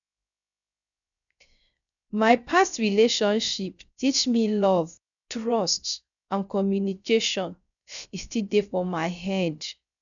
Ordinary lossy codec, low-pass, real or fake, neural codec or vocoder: none; 7.2 kHz; fake; codec, 16 kHz, 0.3 kbps, FocalCodec